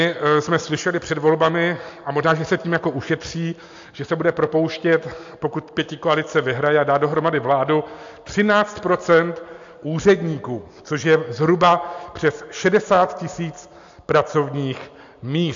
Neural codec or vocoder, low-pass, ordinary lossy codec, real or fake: none; 7.2 kHz; AAC, 64 kbps; real